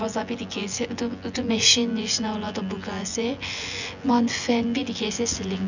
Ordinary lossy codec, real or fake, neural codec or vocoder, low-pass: none; fake; vocoder, 24 kHz, 100 mel bands, Vocos; 7.2 kHz